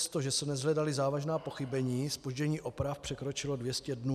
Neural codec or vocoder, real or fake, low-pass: none; real; 14.4 kHz